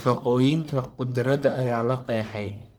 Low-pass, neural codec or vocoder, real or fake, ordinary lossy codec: none; codec, 44.1 kHz, 1.7 kbps, Pupu-Codec; fake; none